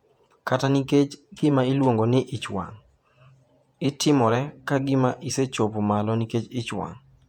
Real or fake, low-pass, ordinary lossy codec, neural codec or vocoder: fake; 19.8 kHz; MP3, 96 kbps; vocoder, 48 kHz, 128 mel bands, Vocos